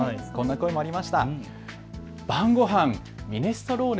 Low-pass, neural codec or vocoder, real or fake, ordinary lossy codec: none; none; real; none